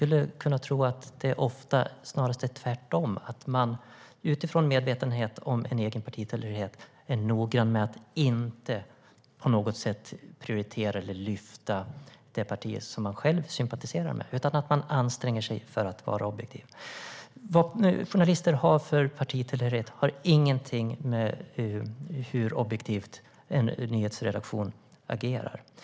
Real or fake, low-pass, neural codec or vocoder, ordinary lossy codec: real; none; none; none